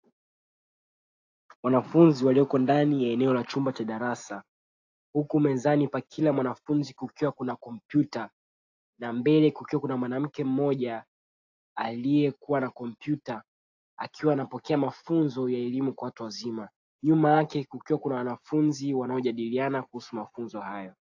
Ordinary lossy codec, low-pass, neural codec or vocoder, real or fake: AAC, 48 kbps; 7.2 kHz; none; real